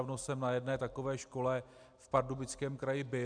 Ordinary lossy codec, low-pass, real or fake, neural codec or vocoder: MP3, 96 kbps; 9.9 kHz; real; none